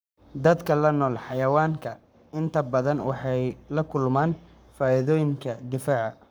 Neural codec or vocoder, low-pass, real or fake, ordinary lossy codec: codec, 44.1 kHz, 7.8 kbps, Pupu-Codec; none; fake; none